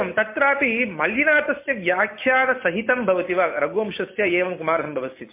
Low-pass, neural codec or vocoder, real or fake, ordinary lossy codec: 3.6 kHz; none; real; MP3, 24 kbps